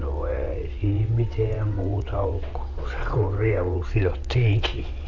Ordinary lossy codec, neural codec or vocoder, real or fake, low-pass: none; vocoder, 22.05 kHz, 80 mel bands, WaveNeXt; fake; 7.2 kHz